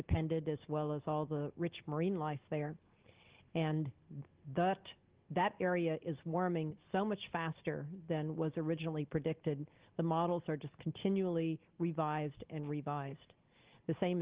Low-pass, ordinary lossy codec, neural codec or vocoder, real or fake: 3.6 kHz; Opus, 16 kbps; none; real